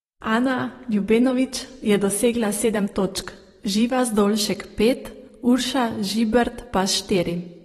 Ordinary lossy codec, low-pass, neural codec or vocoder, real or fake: AAC, 32 kbps; 19.8 kHz; vocoder, 44.1 kHz, 128 mel bands every 512 samples, BigVGAN v2; fake